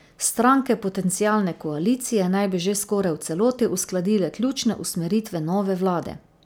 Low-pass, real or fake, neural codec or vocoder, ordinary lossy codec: none; real; none; none